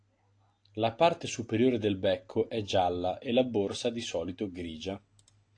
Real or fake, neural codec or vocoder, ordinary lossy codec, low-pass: real; none; AAC, 48 kbps; 9.9 kHz